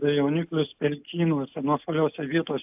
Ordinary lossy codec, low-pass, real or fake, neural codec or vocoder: AAC, 32 kbps; 3.6 kHz; real; none